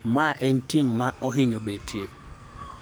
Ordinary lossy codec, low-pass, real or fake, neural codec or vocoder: none; none; fake; codec, 44.1 kHz, 2.6 kbps, SNAC